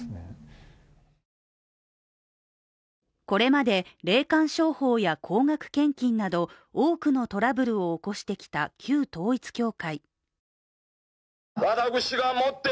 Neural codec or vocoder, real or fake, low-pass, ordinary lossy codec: none; real; none; none